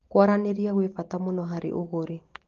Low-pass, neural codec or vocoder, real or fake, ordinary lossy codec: 7.2 kHz; none; real; Opus, 16 kbps